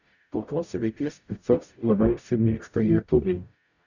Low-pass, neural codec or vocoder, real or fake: 7.2 kHz; codec, 44.1 kHz, 0.9 kbps, DAC; fake